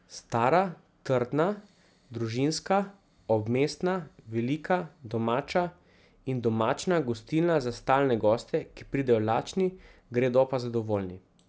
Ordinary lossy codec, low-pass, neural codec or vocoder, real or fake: none; none; none; real